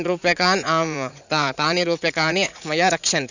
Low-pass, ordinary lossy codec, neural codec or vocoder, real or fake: 7.2 kHz; none; vocoder, 44.1 kHz, 80 mel bands, Vocos; fake